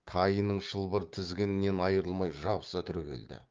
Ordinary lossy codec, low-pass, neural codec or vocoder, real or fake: Opus, 32 kbps; 7.2 kHz; codec, 16 kHz, 6 kbps, DAC; fake